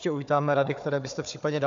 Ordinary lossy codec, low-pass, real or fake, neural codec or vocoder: AAC, 64 kbps; 7.2 kHz; fake; codec, 16 kHz, 4 kbps, FunCodec, trained on Chinese and English, 50 frames a second